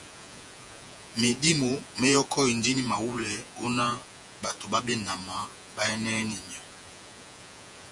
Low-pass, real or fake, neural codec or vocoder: 10.8 kHz; fake; vocoder, 48 kHz, 128 mel bands, Vocos